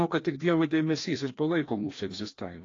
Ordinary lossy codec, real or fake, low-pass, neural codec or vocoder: AAC, 32 kbps; fake; 7.2 kHz; codec, 16 kHz, 1 kbps, FreqCodec, larger model